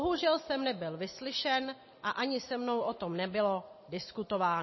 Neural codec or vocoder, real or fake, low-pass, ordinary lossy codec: none; real; 7.2 kHz; MP3, 24 kbps